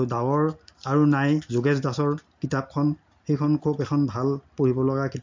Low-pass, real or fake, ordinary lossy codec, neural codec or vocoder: 7.2 kHz; real; MP3, 48 kbps; none